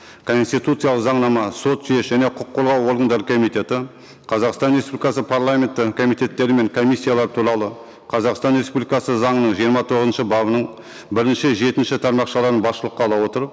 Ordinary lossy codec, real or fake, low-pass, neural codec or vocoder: none; real; none; none